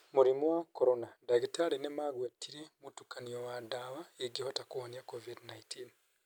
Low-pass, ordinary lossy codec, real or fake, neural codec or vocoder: none; none; real; none